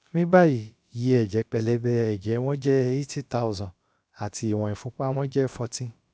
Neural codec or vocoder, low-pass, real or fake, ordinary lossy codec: codec, 16 kHz, about 1 kbps, DyCAST, with the encoder's durations; none; fake; none